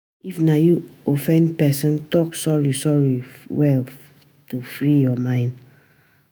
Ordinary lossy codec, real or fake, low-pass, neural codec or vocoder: none; fake; none; autoencoder, 48 kHz, 128 numbers a frame, DAC-VAE, trained on Japanese speech